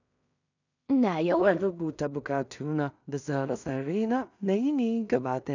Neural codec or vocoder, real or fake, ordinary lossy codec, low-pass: codec, 16 kHz in and 24 kHz out, 0.4 kbps, LongCat-Audio-Codec, two codebook decoder; fake; none; 7.2 kHz